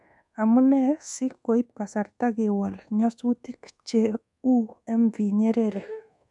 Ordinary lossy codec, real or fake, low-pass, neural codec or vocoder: none; fake; 10.8 kHz; codec, 24 kHz, 1.2 kbps, DualCodec